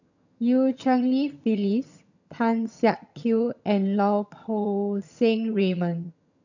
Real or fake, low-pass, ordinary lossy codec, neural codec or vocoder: fake; 7.2 kHz; none; vocoder, 22.05 kHz, 80 mel bands, HiFi-GAN